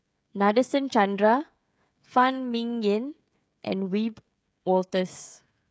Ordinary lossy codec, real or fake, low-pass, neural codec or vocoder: none; fake; none; codec, 16 kHz, 16 kbps, FreqCodec, smaller model